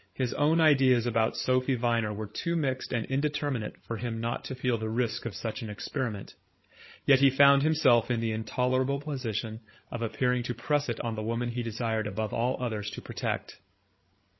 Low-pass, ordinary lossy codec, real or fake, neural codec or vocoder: 7.2 kHz; MP3, 24 kbps; fake; codec, 16 kHz, 16 kbps, FreqCodec, larger model